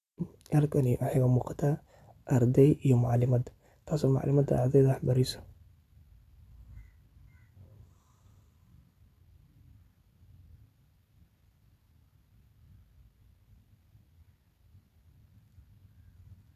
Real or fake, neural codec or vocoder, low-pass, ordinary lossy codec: real; none; 14.4 kHz; none